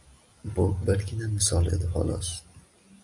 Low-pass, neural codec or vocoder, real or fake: 10.8 kHz; none; real